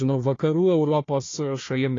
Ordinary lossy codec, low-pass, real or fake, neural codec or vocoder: AAC, 32 kbps; 7.2 kHz; fake; codec, 16 kHz, 1 kbps, FunCodec, trained on Chinese and English, 50 frames a second